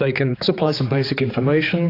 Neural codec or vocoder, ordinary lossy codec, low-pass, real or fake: codec, 16 kHz, 4 kbps, X-Codec, HuBERT features, trained on general audio; AAC, 24 kbps; 5.4 kHz; fake